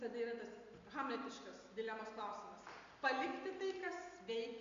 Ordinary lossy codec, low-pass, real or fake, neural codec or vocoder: AAC, 48 kbps; 7.2 kHz; real; none